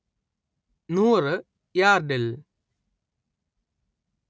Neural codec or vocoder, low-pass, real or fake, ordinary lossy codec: none; none; real; none